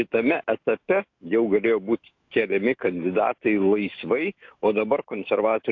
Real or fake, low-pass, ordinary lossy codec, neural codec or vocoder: fake; 7.2 kHz; AAC, 48 kbps; autoencoder, 48 kHz, 128 numbers a frame, DAC-VAE, trained on Japanese speech